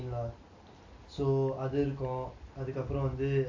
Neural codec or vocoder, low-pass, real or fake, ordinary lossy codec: none; 7.2 kHz; real; AAC, 48 kbps